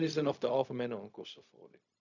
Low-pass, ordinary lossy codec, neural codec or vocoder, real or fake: 7.2 kHz; none; codec, 16 kHz, 0.4 kbps, LongCat-Audio-Codec; fake